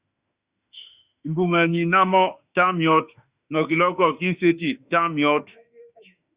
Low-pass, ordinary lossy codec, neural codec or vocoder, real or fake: 3.6 kHz; Opus, 64 kbps; autoencoder, 48 kHz, 32 numbers a frame, DAC-VAE, trained on Japanese speech; fake